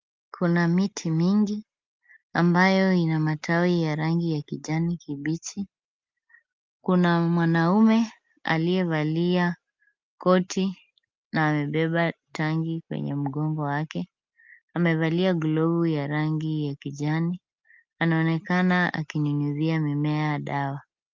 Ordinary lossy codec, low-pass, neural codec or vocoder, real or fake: Opus, 24 kbps; 7.2 kHz; none; real